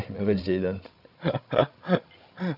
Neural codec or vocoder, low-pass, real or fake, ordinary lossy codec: codec, 16 kHz, 16 kbps, FreqCodec, larger model; 5.4 kHz; fake; none